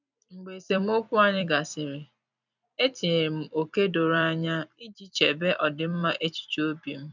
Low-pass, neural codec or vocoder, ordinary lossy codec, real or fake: 7.2 kHz; vocoder, 44.1 kHz, 128 mel bands every 256 samples, BigVGAN v2; none; fake